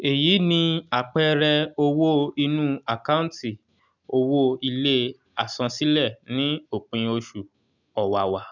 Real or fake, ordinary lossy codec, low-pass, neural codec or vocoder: real; none; 7.2 kHz; none